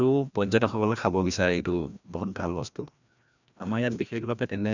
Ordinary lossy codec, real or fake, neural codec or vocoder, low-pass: none; fake; codec, 16 kHz, 1 kbps, FreqCodec, larger model; 7.2 kHz